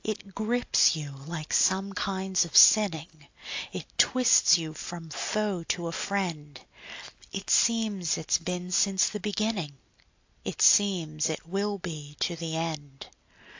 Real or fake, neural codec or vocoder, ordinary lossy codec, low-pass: real; none; AAC, 48 kbps; 7.2 kHz